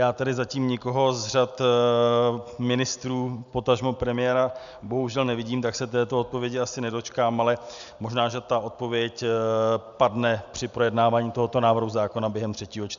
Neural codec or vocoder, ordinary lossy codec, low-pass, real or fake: none; MP3, 96 kbps; 7.2 kHz; real